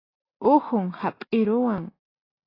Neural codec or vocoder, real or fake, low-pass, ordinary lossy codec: none; real; 5.4 kHz; AAC, 24 kbps